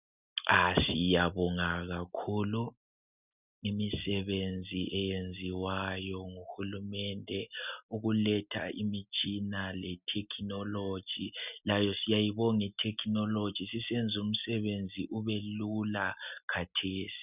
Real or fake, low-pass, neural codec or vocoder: real; 3.6 kHz; none